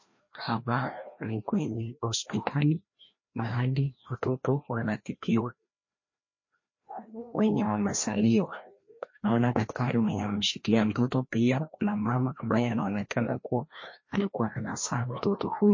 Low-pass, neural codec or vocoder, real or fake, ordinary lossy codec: 7.2 kHz; codec, 16 kHz, 1 kbps, FreqCodec, larger model; fake; MP3, 32 kbps